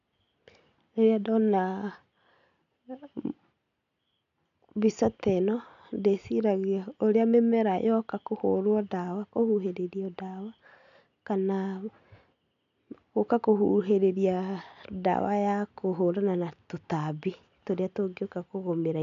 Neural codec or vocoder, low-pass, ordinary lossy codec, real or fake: none; 7.2 kHz; none; real